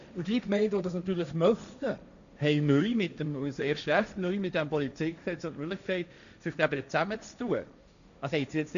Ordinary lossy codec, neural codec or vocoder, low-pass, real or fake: none; codec, 16 kHz, 1.1 kbps, Voila-Tokenizer; 7.2 kHz; fake